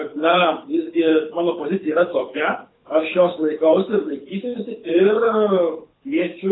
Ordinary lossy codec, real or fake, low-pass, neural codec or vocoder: AAC, 16 kbps; fake; 7.2 kHz; codec, 24 kHz, 6 kbps, HILCodec